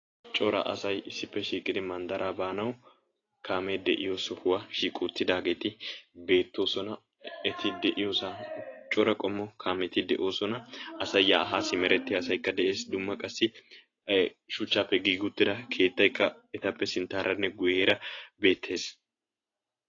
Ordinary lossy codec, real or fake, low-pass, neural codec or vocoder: AAC, 32 kbps; real; 7.2 kHz; none